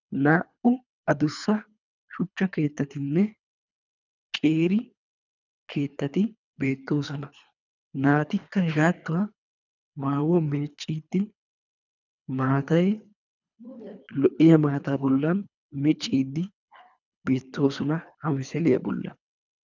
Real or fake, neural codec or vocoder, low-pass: fake; codec, 24 kHz, 3 kbps, HILCodec; 7.2 kHz